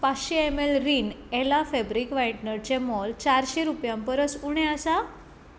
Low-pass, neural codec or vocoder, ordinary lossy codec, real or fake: none; none; none; real